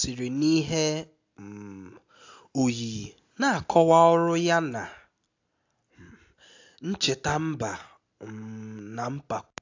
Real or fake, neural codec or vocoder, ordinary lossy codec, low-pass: real; none; AAC, 48 kbps; 7.2 kHz